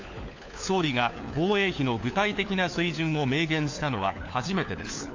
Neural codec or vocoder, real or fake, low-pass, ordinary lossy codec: codec, 16 kHz, 4 kbps, FunCodec, trained on LibriTTS, 50 frames a second; fake; 7.2 kHz; AAC, 48 kbps